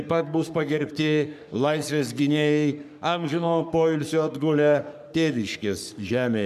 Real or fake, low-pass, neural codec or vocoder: fake; 14.4 kHz; codec, 44.1 kHz, 3.4 kbps, Pupu-Codec